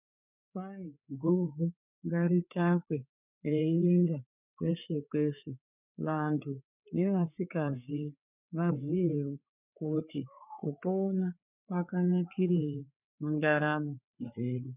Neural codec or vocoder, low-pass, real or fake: codec, 16 kHz, 4 kbps, FreqCodec, larger model; 3.6 kHz; fake